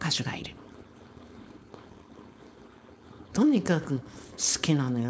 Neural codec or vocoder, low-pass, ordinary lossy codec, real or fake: codec, 16 kHz, 4.8 kbps, FACodec; none; none; fake